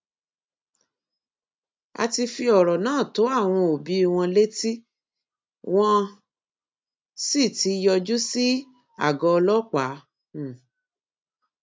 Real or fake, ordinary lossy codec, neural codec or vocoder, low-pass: real; none; none; none